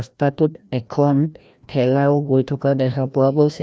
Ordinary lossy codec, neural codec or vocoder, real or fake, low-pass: none; codec, 16 kHz, 1 kbps, FreqCodec, larger model; fake; none